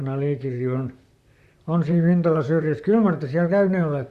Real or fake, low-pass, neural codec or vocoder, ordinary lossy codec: fake; 14.4 kHz; vocoder, 44.1 kHz, 128 mel bands, Pupu-Vocoder; Opus, 64 kbps